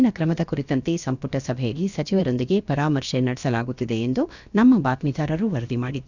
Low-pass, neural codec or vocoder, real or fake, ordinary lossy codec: 7.2 kHz; codec, 16 kHz, about 1 kbps, DyCAST, with the encoder's durations; fake; none